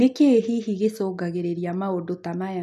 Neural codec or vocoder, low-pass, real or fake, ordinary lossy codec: none; 14.4 kHz; real; none